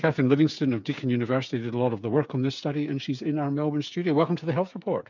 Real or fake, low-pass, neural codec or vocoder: fake; 7.2 kHz; codec, 16 kHz, 8 kbps, FreqCodec, smaller model